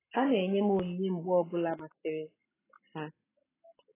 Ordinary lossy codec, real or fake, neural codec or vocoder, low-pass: AAC, 16 kbps; real; none; 3.6 kHz